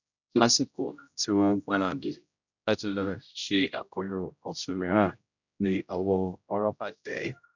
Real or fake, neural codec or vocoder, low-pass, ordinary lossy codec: fake; codec, 16 kHz, 0.5 kbps, X-Codec, HuBERT features, trained on general audio; 7.2 kHz; none